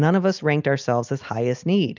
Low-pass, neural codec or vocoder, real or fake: 7.2 kHz; none; real